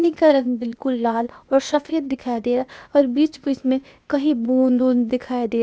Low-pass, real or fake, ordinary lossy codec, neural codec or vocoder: none; fake; none; codec, 16 kHz, 0.8 kbps, ZipCodec